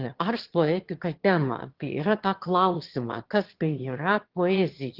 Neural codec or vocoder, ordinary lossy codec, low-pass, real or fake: autoencoder, 22.05 kHz, a latent of 192 numbers a frame, VITS, trained on one speaker; Opus, 16 kbps; 5.4 kHz; fake